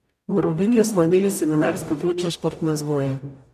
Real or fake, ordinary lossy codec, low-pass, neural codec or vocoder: fake; none; 14.4 kHz; codec, 44.1 kHz, 0.9 kbps, DAC